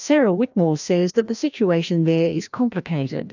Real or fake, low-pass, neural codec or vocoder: fake; 7.2 kHz; codec, 16 kHz, 1 kbps, FreqCodec, larger model